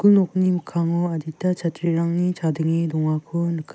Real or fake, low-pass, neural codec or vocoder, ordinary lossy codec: real; none; none; none